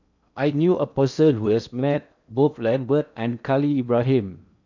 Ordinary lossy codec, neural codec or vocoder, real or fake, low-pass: none; codec, 16 kHz in and 24 kHz out, 0.8 kbps, FocalCodec, streaming, 65536 codes; fake; 7.2 kHz